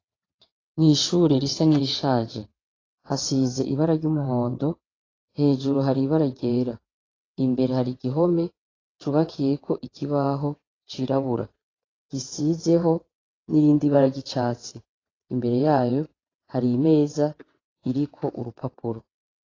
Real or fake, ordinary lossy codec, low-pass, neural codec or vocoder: fake; AAC, 32 kbps; 7.2 kHz; vocoder, 22.05 kHz, 80 mel bands, WaveNeXt